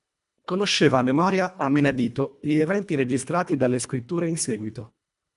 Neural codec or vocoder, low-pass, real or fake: codec, 24 kHz, 1.5 kbps, HILCodec; 10.8 kHz; fake